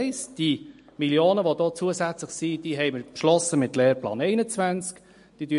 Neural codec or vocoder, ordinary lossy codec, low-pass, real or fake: none; MP3, 48 kbps; 10.8 kHz; real